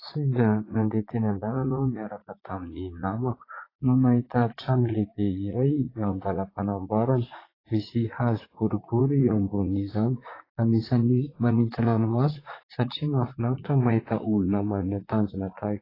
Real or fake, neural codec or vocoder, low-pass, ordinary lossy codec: fake; vocoder, 44.1 kHz, 80 mel bands, Vocos; 5.4 kHz; AAC, 24 kbps